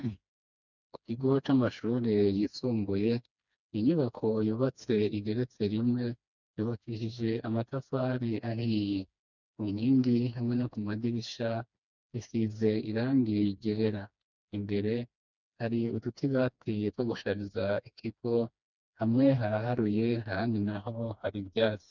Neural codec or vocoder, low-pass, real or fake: codec, 16 kHz, 2 kbps, FreqCodec, smaller model; 7.2 kHz; fake